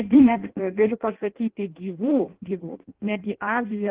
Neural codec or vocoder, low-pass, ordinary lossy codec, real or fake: codec, 16 kHz in and 24 kHz out, 0.6 kbps, FireRedTTS-2 codec; 3.6 kHz; Opus, 16 kbps; fake